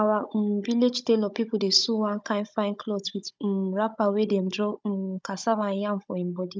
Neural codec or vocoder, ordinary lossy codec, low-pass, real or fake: codec, 16 kHz, 16 kbps, FunCodec, trained on Chinese and English, 50 frames a second; none; none; fake